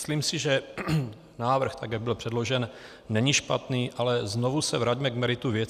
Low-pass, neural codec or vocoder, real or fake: 14.4 kHz; none; real